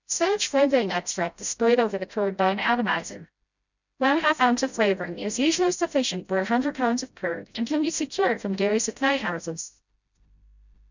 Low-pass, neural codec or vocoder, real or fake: 7.2 kHz; codec, 16 kHz, 0.5 kbps, FreqCodec, smaller model; fake